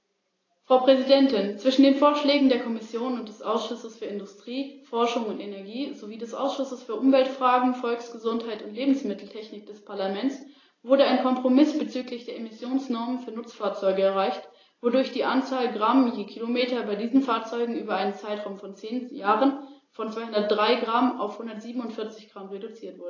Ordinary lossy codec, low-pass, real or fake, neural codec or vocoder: AAC, 32 kbps; 7.2 kHz; real; none